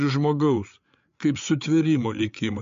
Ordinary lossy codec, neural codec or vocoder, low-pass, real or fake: MP3, 48 kbps; codec, 16 kHz, 8 kbps, FreqCodec, larger model; 7.2 kHz; fake